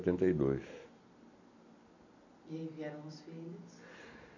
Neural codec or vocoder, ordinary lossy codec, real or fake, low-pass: none; none; real; 7.2 kHz